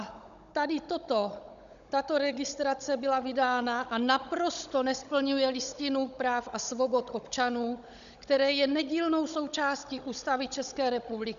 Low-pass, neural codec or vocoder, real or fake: 7.2 kHz; codec, 16 kHz, 16 kbps, FunCodec, trained on Chinese and English, 50 frames a second; fake